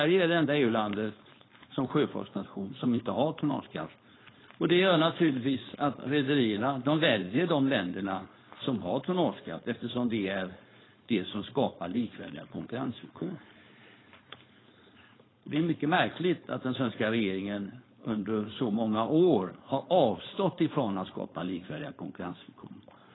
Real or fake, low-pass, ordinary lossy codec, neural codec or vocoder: fake; 7.2 kHz; AAC, 16 kbps; codec, 16 kHz, 4.8 kbps, FACodec